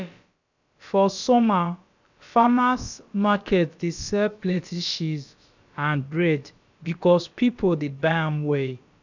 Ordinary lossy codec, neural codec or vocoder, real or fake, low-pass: none; codec, 16 kHz, about 1 kbps, DyCAST, with the encoder's durations; fake; 7.2 kHz